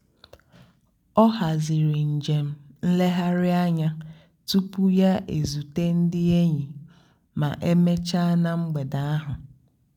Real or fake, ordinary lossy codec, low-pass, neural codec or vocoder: real; none; 19.8 kHz; none